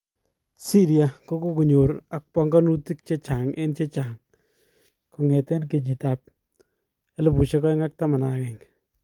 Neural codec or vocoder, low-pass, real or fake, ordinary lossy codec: none; 19.8 kHz; real; Opus, 32 kbps